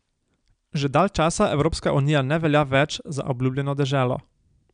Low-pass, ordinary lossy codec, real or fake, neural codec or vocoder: 9.9 kHz; none; real; none